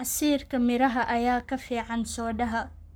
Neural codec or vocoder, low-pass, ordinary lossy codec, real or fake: codec, 44.1 kHz, 7.8 kbps, Pupu-Codec; none; none; fake